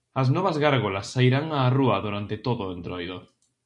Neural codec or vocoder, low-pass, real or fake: none; 10.8 kHz; real